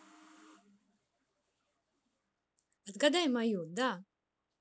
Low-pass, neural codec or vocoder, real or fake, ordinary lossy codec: none; none; real; none